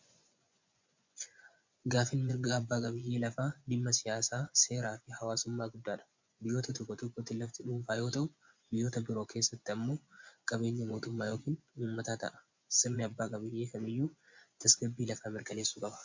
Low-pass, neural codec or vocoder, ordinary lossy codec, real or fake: 7.2 kHz; vocoder, 24 kHz, 100 mel bands, Vocos; MP3, 64 kbps; fake